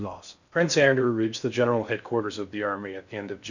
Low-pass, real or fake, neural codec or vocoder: 7.2 kHz; fake; codec, 16 kHz in and 24 kHz out, 0.6 kbps, FocalCodec, streaming, 4096 codes